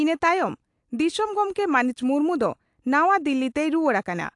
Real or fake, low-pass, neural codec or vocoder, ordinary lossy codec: real; 10.8 kHz; none; AAC, 64 kbps